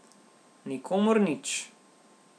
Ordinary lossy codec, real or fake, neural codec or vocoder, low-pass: none; real; none; none